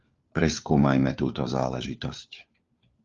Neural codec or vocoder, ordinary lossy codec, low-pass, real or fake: codec, 16 kHz, 4 kbps, FunCodec, trained on LibriTTS, 50 frames a second; Opus, 32 kbps; 7.2 kHz; fake